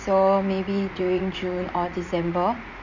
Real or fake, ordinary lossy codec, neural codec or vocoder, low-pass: fake; none; vocoder, 44.1 kHz, 80 mel bands, Vocos; 7.2 kHz